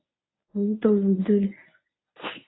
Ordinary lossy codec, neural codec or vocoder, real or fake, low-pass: AAC, 16 kbps; codec, 24 kHz, 0.9 kbps, WavTokenizer, medium speech release version 1; fake; 7.2 kHz